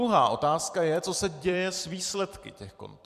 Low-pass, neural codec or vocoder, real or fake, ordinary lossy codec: 14.4 kHz; vocoder, 44.1 kHz, 128 mel bands every 256 samples, BigVGAN v2; fake; MP3, 96 kbps